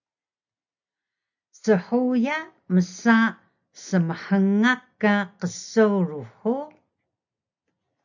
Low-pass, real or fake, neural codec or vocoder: 7.2 kHz; real; none